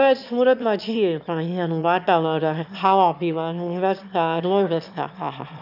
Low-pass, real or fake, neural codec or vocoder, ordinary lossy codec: 5.4 kHz; fake; autoencoder, 22.05 kHz, a latent of 192 numbers a frame, VITS, trained on one speaker; none